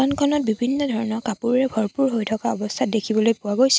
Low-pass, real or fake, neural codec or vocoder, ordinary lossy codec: none; real; none; none